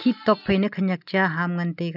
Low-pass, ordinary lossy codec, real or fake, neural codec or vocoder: 5.4 kHz; none; real; none